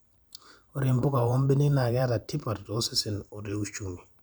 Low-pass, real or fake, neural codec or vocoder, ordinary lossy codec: none; fake; vocoder, 44.1 kHz, 128 mel bands every 512 samples, BigVGAN v2; none